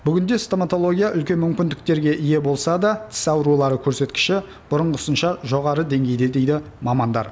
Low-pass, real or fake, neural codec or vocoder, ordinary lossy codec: none; real; none; none